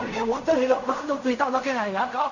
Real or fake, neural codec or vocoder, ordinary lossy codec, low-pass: fake; codec, 16 kHz in and 24 kHz out, 0.4 kbps, LongCat-Audio-Codec, fine tuned four codebook decoder; none; 7.2 kHz